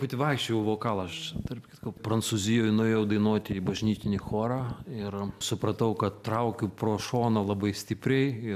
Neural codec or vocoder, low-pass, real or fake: none; 14.4 kHz; real